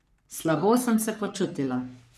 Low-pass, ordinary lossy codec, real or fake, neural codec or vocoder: 14.4 kHz; none; fake; codec, 44.1 kHz, 3.4 kbps, Pupu-Codec